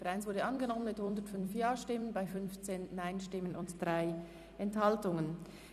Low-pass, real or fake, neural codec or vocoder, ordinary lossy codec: 14.4 kHz; fake; vocoder, 44.1 kHz, 128 mel bands every 256 samples, BigVGAN v2; none